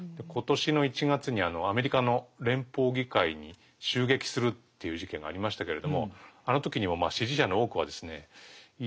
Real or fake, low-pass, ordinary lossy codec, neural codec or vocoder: real; none; none; none